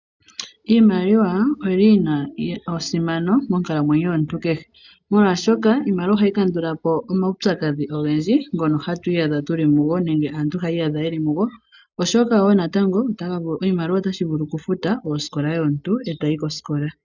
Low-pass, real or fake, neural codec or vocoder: 7.2 kHz; real; none